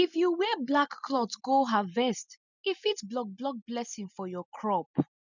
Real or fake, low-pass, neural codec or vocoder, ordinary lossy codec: real; 7.2 kHz; none; none